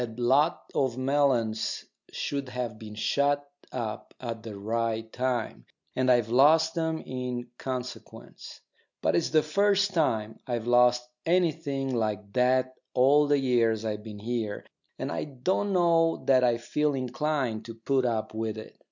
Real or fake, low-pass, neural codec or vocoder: real; 7.2 kHz; none